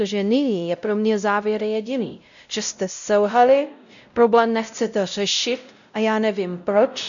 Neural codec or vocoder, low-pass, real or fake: codec, 16 kHz, 0.5 kbps, X-Codec, WavLM features, trained on Multilingual LibriSpeech; 7.2 kHz; fake